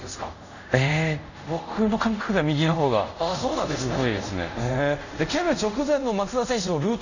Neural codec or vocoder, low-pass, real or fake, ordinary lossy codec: codec, 24 kHz, 0.5 kbps, DualCodec; 7.2 kHz; fake; none